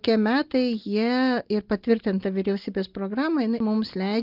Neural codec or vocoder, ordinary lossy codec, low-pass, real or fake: none; Opus, 24 kbps; 5.4 kHz; real